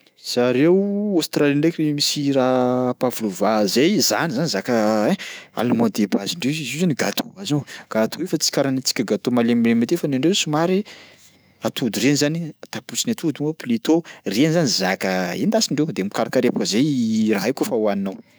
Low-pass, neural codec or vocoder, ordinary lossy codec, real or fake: none; autoencoder, 48 kHz, 128 numbers a frame, DAC-VAE, trained on Japanese speech; none; fake